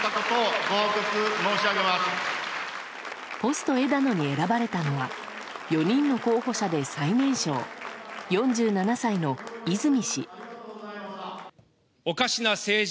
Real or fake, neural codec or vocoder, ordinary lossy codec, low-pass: real; none; none; none